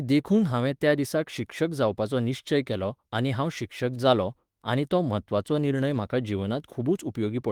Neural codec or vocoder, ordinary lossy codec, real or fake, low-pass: autoencoder, 48 kHz, 32 numbers a frame, DAC-VAE, trained on Japanese speech; Opus, 24 kbps; fake; 19.8 kHz